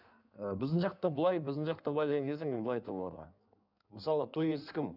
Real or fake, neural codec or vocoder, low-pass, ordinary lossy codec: fake; codec, 16 kHz in and 24 kHz out, 1.1 kbps, FireRedTTS-2 codec; 5.4 kHz; none